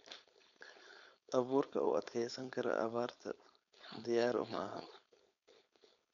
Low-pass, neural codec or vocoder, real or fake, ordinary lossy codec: 7.2 kHz; codec, 16 kHz, 4.8 kbps, FACodec; fake; none